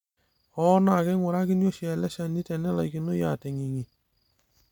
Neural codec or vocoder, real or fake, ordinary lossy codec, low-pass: none; real; none; 19.8 kHz